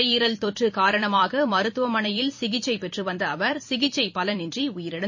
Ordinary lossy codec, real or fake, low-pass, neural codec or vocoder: MP3, 32 kbps; fake; 7.2 kHz; vocoder, 44.1 kHz, 128 mel bands every 256 samples, BigVGAN v2